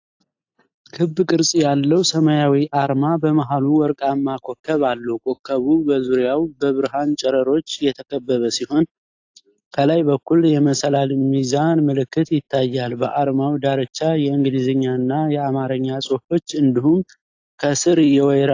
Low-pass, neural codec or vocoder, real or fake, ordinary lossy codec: 7.2 kHz; none; real; AAC, 48 kbps